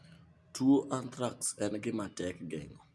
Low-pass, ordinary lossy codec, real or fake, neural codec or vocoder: none; none; real; none